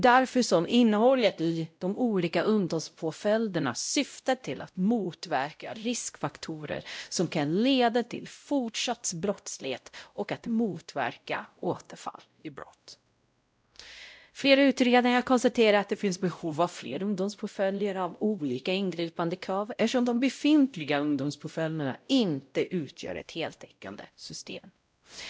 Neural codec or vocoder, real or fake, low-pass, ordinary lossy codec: codec, 16 kHz, 0.5 kbps, X-Codec, WavLM features, trained on Multilingual LibriSpeech; fake; none; none